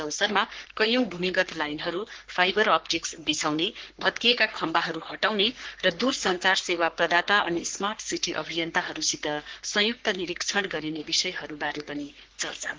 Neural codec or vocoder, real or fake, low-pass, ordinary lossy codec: codec, 44.1 kHz, 3.4 kbps, Pupu-Codec; fake; 7.2 kHz; Opus, 16 kbps